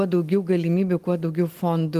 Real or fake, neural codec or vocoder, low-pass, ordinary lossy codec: real; none; 14.4 kHz; Opus, 24 kbps